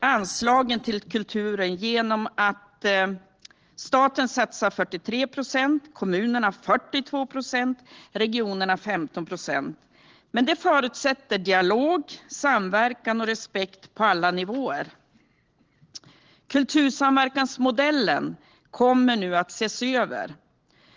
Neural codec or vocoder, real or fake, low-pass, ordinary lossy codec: none; real; 7.2 kHz; Opus, 16 kbps